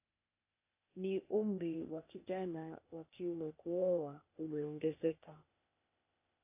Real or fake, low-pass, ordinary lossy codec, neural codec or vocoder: fake; 3.6 kHz; AAC, 32 kbps; codec, 16 kHz, 0.8 kbps, ZipCodec